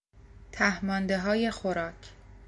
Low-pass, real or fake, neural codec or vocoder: 10.8 kHz; real; none